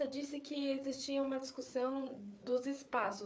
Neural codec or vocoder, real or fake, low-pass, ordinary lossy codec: codec, 16 kHz, 4 kbps, FreqCodec, larger model; fake; none; none